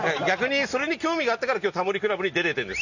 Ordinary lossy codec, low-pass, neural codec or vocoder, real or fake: none; 7.2 kHz; none; real